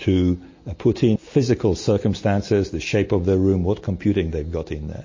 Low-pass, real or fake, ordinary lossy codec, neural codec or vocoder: 7.2 kHz; real; MP3, 32 kbps; none